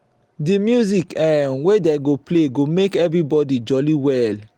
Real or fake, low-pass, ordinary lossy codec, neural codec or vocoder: real; 19.8 kHz; Opus, 24 kbps; none